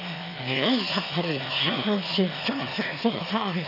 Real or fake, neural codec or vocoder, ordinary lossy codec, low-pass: fake; autoencoder, 22.05 kHz, a latent of 192 numbers a frame, VITS, trained on one speaker; none; 5.4 kHz